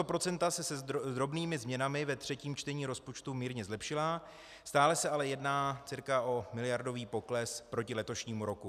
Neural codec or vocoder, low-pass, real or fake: none; 14.4 kHz; real